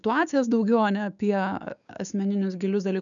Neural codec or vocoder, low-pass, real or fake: codec, 16 kHz, 6 kbps, DAC; 7.2 kHz; fake